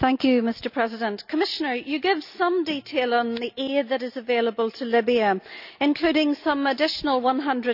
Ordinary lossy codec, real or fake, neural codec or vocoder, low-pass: none; real; none; 5.4 kHz